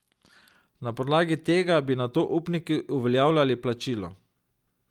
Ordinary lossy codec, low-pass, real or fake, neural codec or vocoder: Opus, 32 kbps; 19.8 kHz; real; none